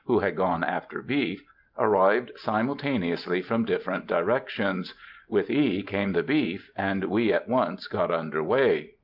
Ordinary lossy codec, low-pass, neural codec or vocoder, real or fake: Opus, 32 kbps; 5.4 kHz; none; real